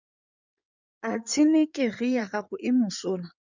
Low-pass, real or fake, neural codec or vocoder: 7.2 kHz; fake; codec, 16 kHz in and 24 kHz out, 2.2 kbps, FireRedTTS-2 codec